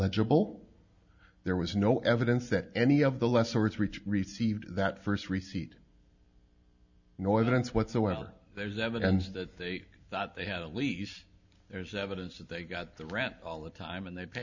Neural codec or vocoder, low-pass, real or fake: none; 7.2 kHz; real